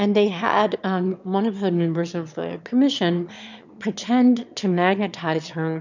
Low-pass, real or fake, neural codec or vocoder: 7.2 kHz; fake; autoencoder, 22.05 kHz, a latent of 192 numbers a frame, VITS, trained on one speaker